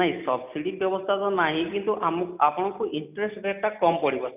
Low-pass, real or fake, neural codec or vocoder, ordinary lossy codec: 3.6 kHz; real; none; none